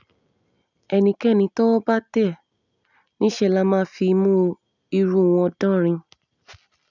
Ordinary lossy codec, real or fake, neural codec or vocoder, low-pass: none; real; none; 7.2 kHz